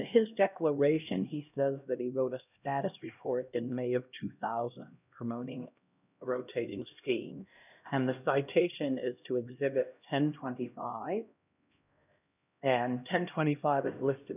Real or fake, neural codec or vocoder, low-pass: fake; codec, 16 kHz, 1 kbps, X-Codec, HuBERT features, trained on LibriSpeech; 3.6 kHz